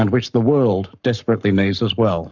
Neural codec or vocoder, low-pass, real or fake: codec, 44.1 kHz, 7.8 kbps, Pupu-Codec; 7.2 kHz; fake